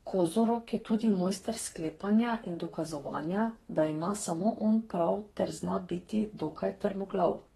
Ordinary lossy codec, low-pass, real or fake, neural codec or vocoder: AAC, 32 kbps; 14.4 kHz; fake; codec, 32 kHz, 1.9 kbps, SNAC